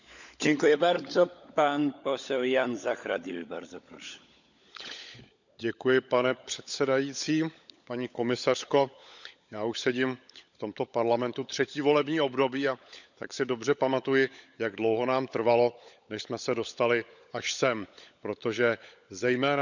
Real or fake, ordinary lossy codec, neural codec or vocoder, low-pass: fake; none; codec, 16 kHz, 16 kbps, FunCodec, trained on LibriTTS, 50 frames a second; 7.2 kHz